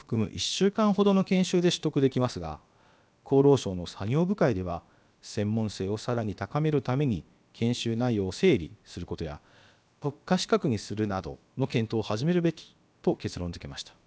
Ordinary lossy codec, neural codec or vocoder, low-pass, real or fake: none; codec, 16 kHz, about 1 kbps, DyCAST, with the encoder's durations; none; fake